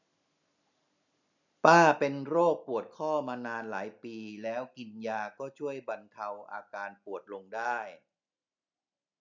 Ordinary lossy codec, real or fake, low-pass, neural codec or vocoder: none; real; 7.2 kHz; none